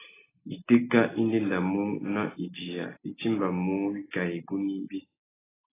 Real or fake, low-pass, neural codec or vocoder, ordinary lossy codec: real; 3.6 kHz; none; AAC, 16 kbps